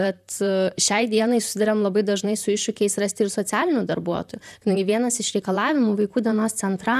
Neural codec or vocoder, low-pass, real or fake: vocoder, 44.1 kHz, 128 mel bands, Pupu-Vocoder; 14.4 kHz; fake